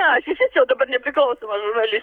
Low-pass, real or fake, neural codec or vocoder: 19.8 kHz; fake; codec, 44.1 kHz, 7.8 kbps, Pupu-Codec